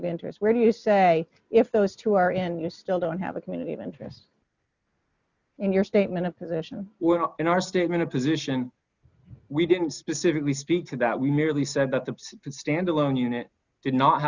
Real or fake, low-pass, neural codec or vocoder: real; 7.2 kHz; none